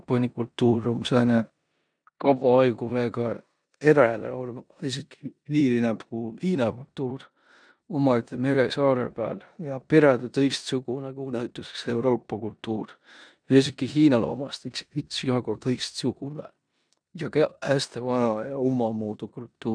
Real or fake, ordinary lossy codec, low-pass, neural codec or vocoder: fake; none; 9.9 kHz; codec, 16 kHz in and 24 kHz out, 0.9 kbps, LongCat-Audio-Codec, four codebook decoder